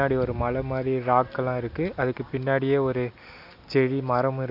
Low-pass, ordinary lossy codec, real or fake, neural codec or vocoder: 5.4 kHz; none; real; none